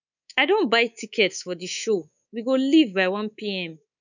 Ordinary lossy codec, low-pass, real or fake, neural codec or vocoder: none; 7.2 kHz; fake; codec, 24 kHz, 3.1 kbps, DualCodec